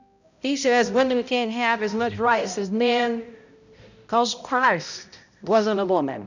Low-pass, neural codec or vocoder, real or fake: 7.2 kHz; codec, 16 kHz, 0.5 kbps, X-Codec, HuBERT features, trained on balanced general audio; fake